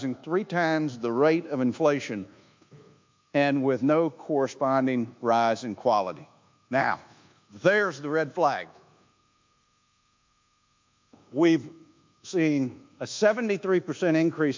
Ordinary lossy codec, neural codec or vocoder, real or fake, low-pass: MP3, 64 kbps; codec, 24 kHz, 1.2 kbps, DualCodec; fake; 7.2 kHz